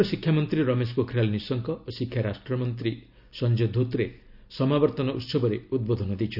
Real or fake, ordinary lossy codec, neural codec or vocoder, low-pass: real; none; none; 5.4 kHz